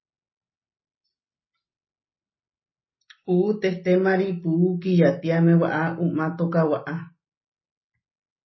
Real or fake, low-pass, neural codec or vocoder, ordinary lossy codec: real; 7.2 kHz; none; MP3, 24 kbps